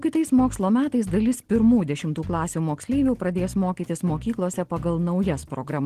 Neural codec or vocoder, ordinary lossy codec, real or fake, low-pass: none; Opus, 16 kbps; real; 14.4 kHz